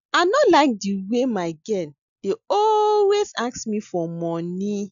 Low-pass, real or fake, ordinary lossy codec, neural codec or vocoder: 7.2 kHz; real; none; none